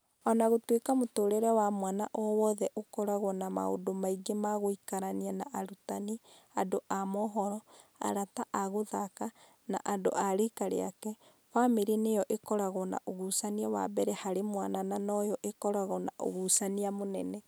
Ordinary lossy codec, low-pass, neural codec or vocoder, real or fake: none; none; none; real